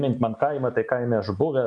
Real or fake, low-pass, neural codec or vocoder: real; 9.9 kHz; none